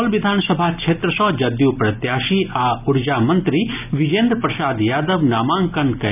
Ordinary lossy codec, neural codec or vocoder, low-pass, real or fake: none; none; 3.6 kHz; real